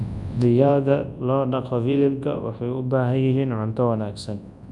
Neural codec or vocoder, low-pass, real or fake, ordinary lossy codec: codec, 24 kHz, 0.9 kbps, WavTokenizer, large speech release; 10.8 kHz; fake; MP3, 96 kbps